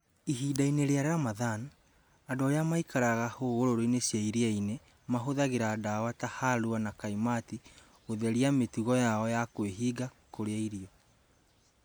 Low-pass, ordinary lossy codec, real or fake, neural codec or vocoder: none; none; real; none